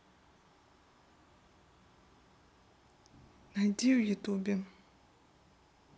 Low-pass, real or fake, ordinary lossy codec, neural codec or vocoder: none; real; none; none